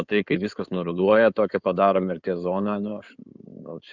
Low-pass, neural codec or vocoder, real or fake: 7.2 kHz; codec, 16 kHz in and 24 kHz out, 2.2 kbps, FireRedTTS-2 codec; fake